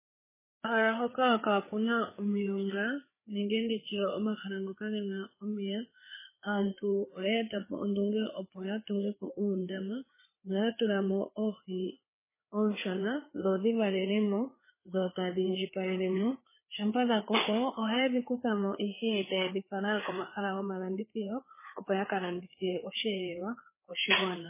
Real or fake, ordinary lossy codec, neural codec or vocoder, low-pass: fake; MP3, 16 kbps; codec, 16 kHz, 4 kbps, FreqCodec, larger model; 3.6 kHz